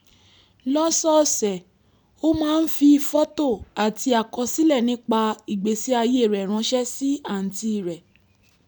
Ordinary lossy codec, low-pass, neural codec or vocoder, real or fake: none; none; none; real